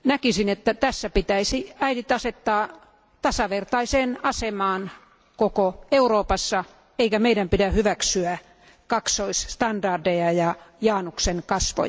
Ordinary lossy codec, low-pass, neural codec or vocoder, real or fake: none; none; none; real